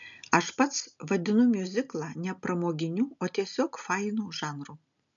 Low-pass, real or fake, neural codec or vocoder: 7.2 kHz; real; none